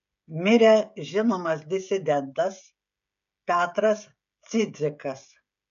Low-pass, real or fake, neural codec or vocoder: 7.2 kHz; fake; codec, 16 kHz, 16 kbps, FreqCodec, smaller model